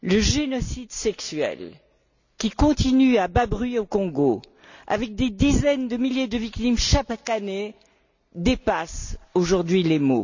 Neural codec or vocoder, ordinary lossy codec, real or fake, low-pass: none; none; real; 7.2 kHz